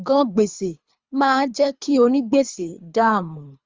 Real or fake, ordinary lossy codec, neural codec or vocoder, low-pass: fake; Opus, 32 kbps; codec, 24 kHz, 3 kbps, HILCodec; 7.2 kHz